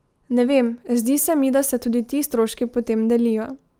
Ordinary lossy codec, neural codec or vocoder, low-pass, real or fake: Opus, 32 kbps; none; 19.8 kHz; real